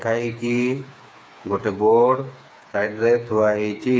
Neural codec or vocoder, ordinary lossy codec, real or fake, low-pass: codec, 16 kHz, 4 kbps, FreqCodec, smaller model; none; fake; none